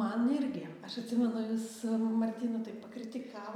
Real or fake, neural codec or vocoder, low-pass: real; none; 19.8 kHz